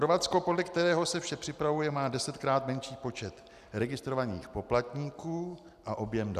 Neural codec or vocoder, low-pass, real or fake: none; 14.4 kHz; real